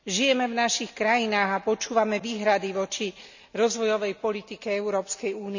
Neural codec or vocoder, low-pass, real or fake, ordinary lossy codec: none; 7.2 kHz; real; none